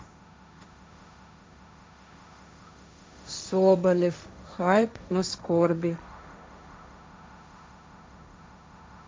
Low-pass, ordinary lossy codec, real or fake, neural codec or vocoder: none; none; fake; codec, 16 kHz, 1.1 kbps, Voila-Tokenizer